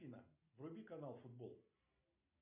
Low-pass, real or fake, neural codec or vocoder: 3.6 kHz; real; none